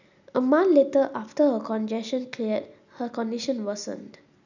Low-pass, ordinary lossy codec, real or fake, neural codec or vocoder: 7.2 kHz; none; real; none